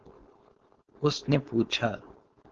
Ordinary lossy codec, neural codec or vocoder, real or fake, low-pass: Opus, 16 kbps; codec, 16 kHz, 4.8 kbps, FACodec; fake; 7.2 kHz